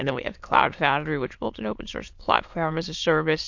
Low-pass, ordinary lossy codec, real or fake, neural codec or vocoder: 7.2 kHz; MP3, 48 kbps; fake; autoencoder, 22.05 kHz, a latent of 192 numbers a frame, VITS, trained on many speakers